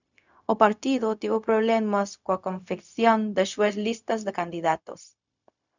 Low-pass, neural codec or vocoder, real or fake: 7.2 kHz; codec, 16 kHz, 0.4 kbps, LongCat-Audio-Codec; fake